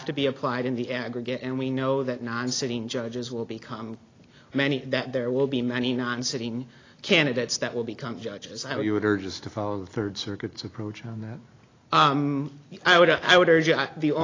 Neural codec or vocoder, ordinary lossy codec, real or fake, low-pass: none; AAC, 32 kbps; real; 7.2 kHz